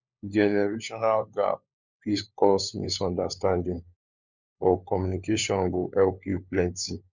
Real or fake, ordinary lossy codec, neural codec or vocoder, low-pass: fake; none; codec, 16 kHz, 4 kbps, FunCodec, trained on LibriTTS, 50 frames a second; 7.2 kHz